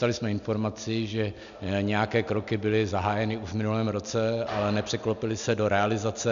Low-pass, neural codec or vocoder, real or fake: 7.2 kHz; none; real